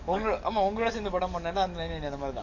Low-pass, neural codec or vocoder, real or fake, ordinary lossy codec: 7.2 kHz; codec, 44.1 kHz, 7.8 kbps, DAC; fake; none